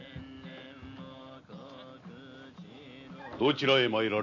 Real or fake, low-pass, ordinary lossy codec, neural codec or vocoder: real; 7.2 kHz; none; none